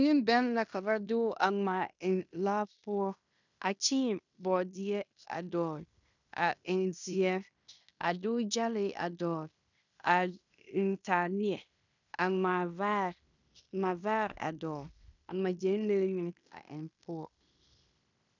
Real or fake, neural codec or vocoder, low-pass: fake; codec, 16 kHz in and 24 kHz out, 0.9 kbps, LongCat-Audio-Codec, fine tuned four codebook decoder; 7.2 kHz